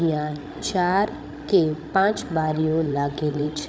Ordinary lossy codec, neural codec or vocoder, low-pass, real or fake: none; codec, 16 kHz, 16 kbps, FreqCodec, larger model; none; fake